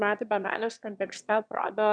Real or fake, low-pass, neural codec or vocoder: fake; 9.9 kHz; autoencoder, 22.05 kHz, a latent of 192 numbers a frame, VITS, trained on one speaker